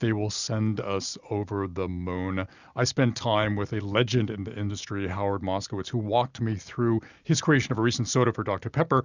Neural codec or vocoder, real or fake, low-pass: none; real; 7.2 kHz